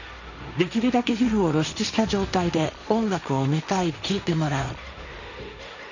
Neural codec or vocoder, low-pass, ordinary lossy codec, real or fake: codec, 16 kHz, 1.1 kbps, Voila-Tokenizer; 7.2 kHz; none; fake